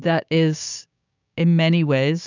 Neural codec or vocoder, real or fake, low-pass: codec, 16 kHz, 6 kbps, DAC; fake; 7.2 kHz